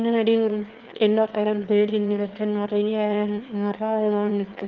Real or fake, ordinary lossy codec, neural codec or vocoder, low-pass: fake; Opus, 32 kbps; autoencoder, 22.05 kHz, a latent of 192 numbers a frame, VITS, trained on one speaker; 7.2 kHz